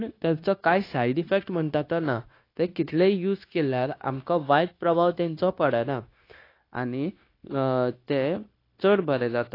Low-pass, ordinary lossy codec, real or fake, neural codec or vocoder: 5.4 kHz; AAC, 32 kbps; fake; codec, 16 kHz, 0.9 kbps, LongCat-Audio-Codec